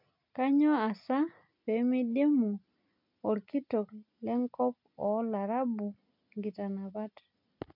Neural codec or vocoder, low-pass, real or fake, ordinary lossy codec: none; 5.4 kHz; real; MP3, 48 kbps